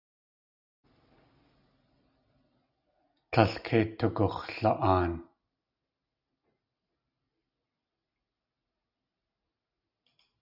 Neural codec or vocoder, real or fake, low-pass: none; real; 5.4 kHz